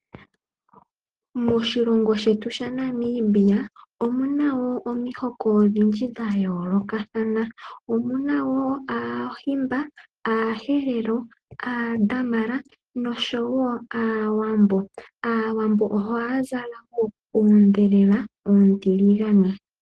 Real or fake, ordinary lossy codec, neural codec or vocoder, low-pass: real; Opus, 16 kbps; none; 10.8 kHz